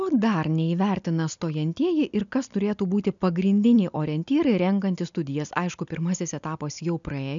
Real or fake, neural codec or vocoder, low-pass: real; none; 7.2 kHz